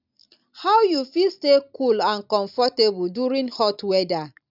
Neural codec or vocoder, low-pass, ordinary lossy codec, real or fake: none; 5.4 kHz; none; real